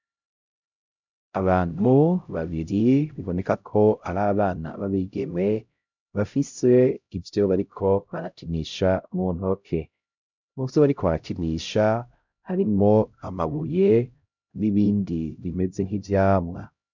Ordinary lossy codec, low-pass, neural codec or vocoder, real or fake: MP3, 64 kbps; 7.2 kHz; codec, 16 kHz, 0.5 kbps, X-Codec, HuBERT features, trained on LibriSpeech; fake